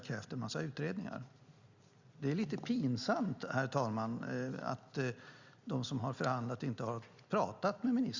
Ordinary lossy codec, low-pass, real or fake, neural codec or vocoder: Opus, 64 kbps; 7.2 kHz; real; none